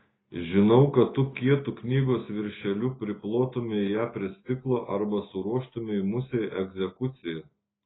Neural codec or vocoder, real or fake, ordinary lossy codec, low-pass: none; real; AAC, 16 kbps; 7.2 kHz